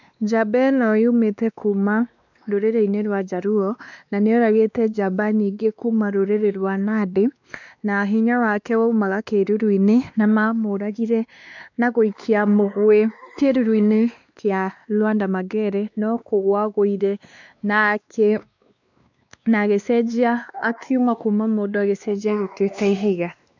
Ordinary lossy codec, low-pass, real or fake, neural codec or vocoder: none; 7.2 kHz; fake; codec, 16 kHz, 2 kbps, X-Codec, WavLM features, trained on Multilingual LibriSpeech